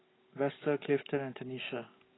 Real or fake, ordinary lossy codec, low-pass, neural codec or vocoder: real; AAC, 16 kbps; 7.2 kHz; none